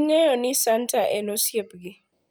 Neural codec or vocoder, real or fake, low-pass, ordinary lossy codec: none; real; none; none